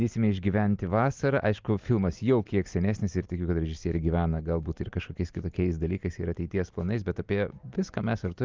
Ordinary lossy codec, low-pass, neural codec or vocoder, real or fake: Opus, 24 kbps; 7.2 kHz; none; real